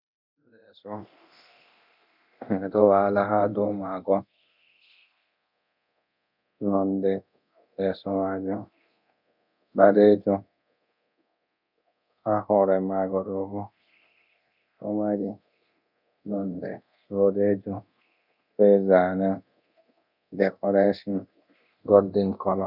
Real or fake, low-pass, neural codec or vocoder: fake; 5.4 kHz; codec, 24 kHz, 0.9 kbps, DualCodec